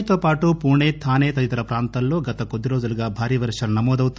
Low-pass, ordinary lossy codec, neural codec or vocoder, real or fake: none; none; none; real